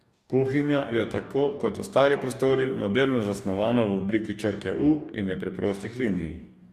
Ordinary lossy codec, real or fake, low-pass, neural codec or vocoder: Opus, 64 kbps; fake; 14.4 kHz; codec, 44.1 kHz, 2.6 kbps, DAC